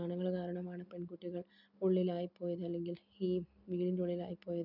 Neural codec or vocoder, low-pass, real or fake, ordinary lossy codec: none; 5.4 kHz; real; none